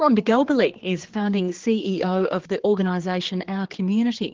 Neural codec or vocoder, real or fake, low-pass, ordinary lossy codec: codec, 16 kHz, 4 kbps, X-Codec, HuBERT features, trained on general audio; fake; 7.2 kHz; Opus, 16 kbps